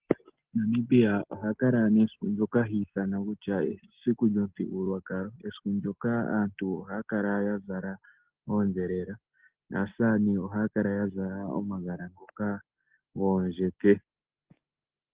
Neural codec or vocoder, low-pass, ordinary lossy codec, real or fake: none; 3.6 kHz; Opus, 16 kbps; real